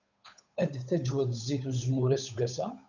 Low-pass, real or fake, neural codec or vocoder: 7.2 kHz; fake; codec, 16 kHz, 8 kbps, FunCodec, trained on Chinese and English, 25 frames a second